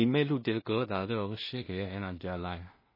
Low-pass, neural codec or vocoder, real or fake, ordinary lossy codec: 5.4 kHz; codec, 16 kHz in and 24 kHz out, 0.4 kbps, LongCat-Audio-Codec, two codebook decoder; fake; MP3, 24 kbps